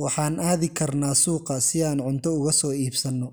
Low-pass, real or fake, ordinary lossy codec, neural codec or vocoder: none; real; none; none